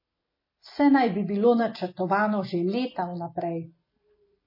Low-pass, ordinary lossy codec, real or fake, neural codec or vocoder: 5.4 kHz; MP3, 24 kbps; real; none